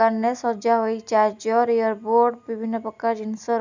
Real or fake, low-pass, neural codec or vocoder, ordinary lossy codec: real; 7.2 kHz; none; none